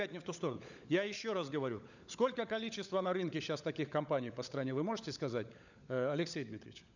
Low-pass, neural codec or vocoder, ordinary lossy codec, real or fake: 7.2 kHz; codec, 16 kHz, 16 kbps, FunCodec, trained on LibriTTS, 50 frames a second; none; fake